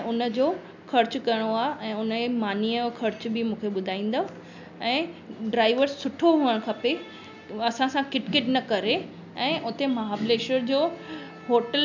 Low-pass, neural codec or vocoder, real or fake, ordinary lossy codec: 7.2 kHz; none; real; none